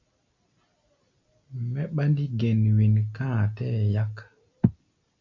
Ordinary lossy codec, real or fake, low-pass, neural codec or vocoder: MP3, 48 kbps; real; 7.2 kHz; none